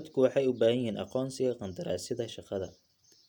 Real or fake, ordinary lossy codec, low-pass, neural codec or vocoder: real; none; 19.8 kHz; none